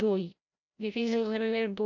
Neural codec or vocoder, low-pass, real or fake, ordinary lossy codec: codec, 16 kHz, 0.5 kbps, FreqCodec, larger model; 7.2 kHz; fake; none